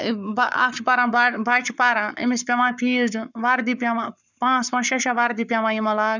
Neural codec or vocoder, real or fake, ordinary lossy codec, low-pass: codec, 44.1 kHz, 7.8 kbps, Pupu-Codec; fake; none; 7.2 kHz